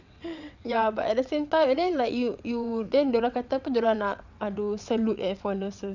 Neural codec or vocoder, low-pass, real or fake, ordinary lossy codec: vocoder, 22.05 kHz, 80 mel bands, WaveNeXt; 7.2 kHz; fake; none